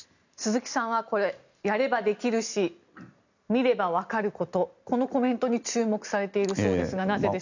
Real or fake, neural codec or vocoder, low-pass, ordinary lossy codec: real; none; 7.2 kHz; none